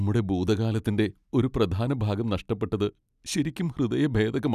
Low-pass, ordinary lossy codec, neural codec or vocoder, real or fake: 14.4 kHz; none; none; real